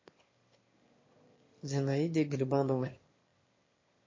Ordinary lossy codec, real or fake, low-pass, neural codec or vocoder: MP3, 32 kbps; fake; 7.2 kHz; autoencoder, 22.05 kHz, a latent of 192 numbers a frame, VITS, trained on one speaker